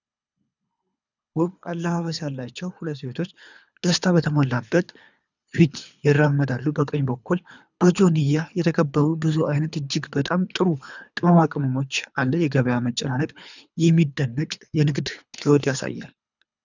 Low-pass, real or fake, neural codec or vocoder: 7.2 kHz; fake; codec, 24 kHz, 3 kbps, HILCodec